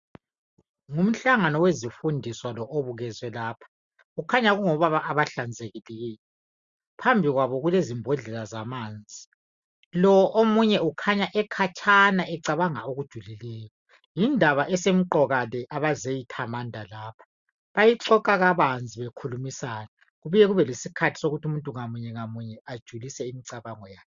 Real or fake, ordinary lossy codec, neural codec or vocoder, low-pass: real; Opus, 64 kbps; none; 7.2 kHz